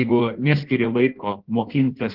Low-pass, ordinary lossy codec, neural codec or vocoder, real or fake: 5.4 kHz; Opus, 16 kbps; codec, 16 kHz in and 24 kHz out, 1.1 kbps, FireRedTTS-2 codec; fake